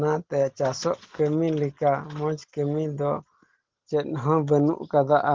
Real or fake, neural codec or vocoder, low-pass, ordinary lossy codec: real; none; 7.2 kHz; Opus, 16 kbps